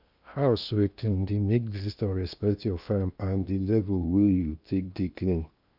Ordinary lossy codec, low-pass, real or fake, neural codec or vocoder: none; 5.4 kHz; fake; codec, 16 kHz in and 24 kHz out, 0.8 kbps, FocalCodec, streaming, 65536 codes